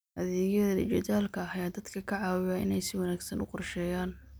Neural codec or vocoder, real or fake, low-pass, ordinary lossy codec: none; real; none; none